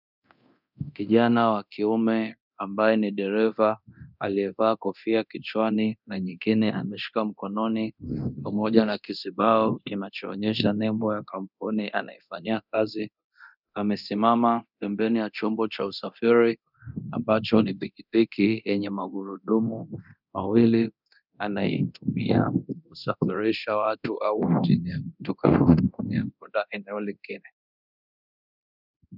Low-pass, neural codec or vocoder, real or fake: 5.4 kHz; codec, 24 kHz, 0.9 kbps, DualCodec; fake